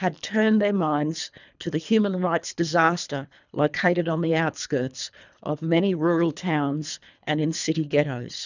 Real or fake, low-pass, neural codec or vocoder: fake; 7.2 kHz; codec, 24 kHz, 3 kbps, HILCodec